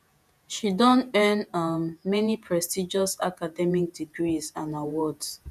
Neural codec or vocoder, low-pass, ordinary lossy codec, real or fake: vocoder, 48 kHz, 128 mel bands, Vocos; 14.4 kHz; none; fake